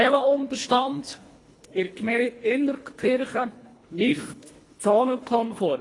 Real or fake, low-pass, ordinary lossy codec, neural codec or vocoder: fake; 10.8 kHz; AAC, 32 kbps; codec, 24 kHz, 1.5 kbps, HILCodec